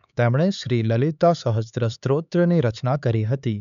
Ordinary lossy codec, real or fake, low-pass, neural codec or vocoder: none; fake; 7.2 kHz; codec, 16 kHz, 4 kbps, X-Codec, HuBERT features, trained on LibriSpeech